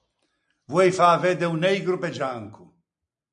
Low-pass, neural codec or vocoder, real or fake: 9.9 kHz; none; real